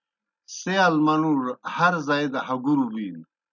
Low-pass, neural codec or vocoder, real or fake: 7.2 kHz; none; real